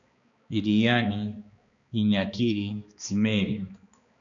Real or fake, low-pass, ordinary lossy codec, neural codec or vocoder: fake; 7.2 kHz; MP3, 96 kbps; codec, 16 kHz, 2 kbps, X-Codec, HuBERT features, trained on balanced general audio